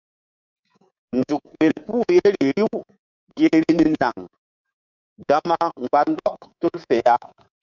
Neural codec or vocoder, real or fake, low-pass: codec, 24 kHz, 3.1 kbps, DualCodec; fake; 7.2 kHz